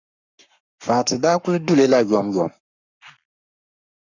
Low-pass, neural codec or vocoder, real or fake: 7.2 kHz; codec, 44.1 kHz, 7.8 kbps, Pupu-Codec; fake